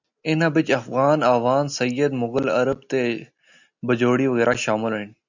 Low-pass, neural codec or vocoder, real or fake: 7.2 kHz; none; real